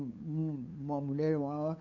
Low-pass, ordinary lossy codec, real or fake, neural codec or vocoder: 7.2 kHz; none; fake; codec, 16 kHz, 8 kbps, FunCodec, trained on LibriTTS, 25 frames a second